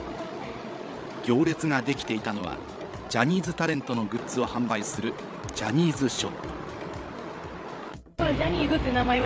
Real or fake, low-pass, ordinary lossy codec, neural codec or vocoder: fake; none; none; codec, 16 kHz, 8 kbps, FreqCodec, larger model